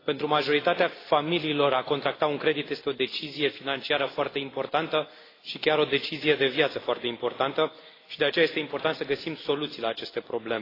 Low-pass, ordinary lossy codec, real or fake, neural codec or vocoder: 5.4 kHz; AAC, 24 kbps; real; none